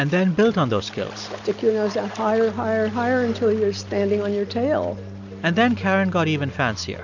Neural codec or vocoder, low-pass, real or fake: none; 7.2 kHz; real